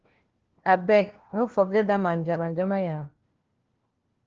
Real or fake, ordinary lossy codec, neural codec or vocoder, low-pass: fake; Opus, 16 kbps; codec, 16 kHz, 1 kbps, FunCodec, trained on LibriTTS, 50 frames a second; 7.2 kHz